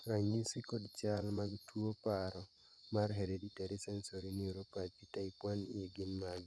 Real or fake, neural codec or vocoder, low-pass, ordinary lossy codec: fake; vocoder, 24 kHz, 100 mel bands, Vocos; none; none